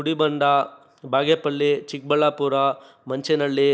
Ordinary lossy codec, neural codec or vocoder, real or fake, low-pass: none; none; real; none